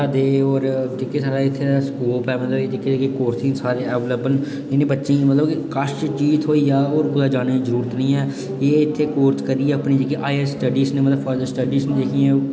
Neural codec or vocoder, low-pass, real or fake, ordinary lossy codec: none; none; real; none